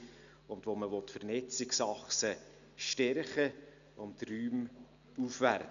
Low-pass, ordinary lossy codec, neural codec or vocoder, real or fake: 7.2 kHz; none; none; real